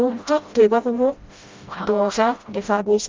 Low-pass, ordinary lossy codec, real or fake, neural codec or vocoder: 7.2 kHz; Opus, 32 kbps; fake; codec, 16 kHz, 0.5 kbps, FreqCodec, smaller model